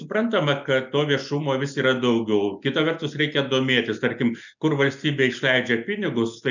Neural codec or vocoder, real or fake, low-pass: none; real; 7.2 kHz